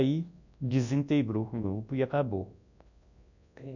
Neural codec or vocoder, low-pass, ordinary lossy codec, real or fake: codec, 24 kHz, 0.9 kbps, WavTokenizer, large speech release; 7.2 kHz; none; fake